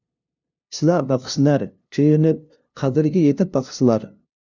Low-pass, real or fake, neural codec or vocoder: 7.2 kHz; fake; codec, 16 kHz, 0.5 kbps, FunCodec, trained on LibriTTS, 25 frames a second